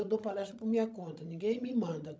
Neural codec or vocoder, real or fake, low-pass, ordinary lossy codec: codec, 16 kHz, 16 kbps, FreqCodec, larger model; fake; none; none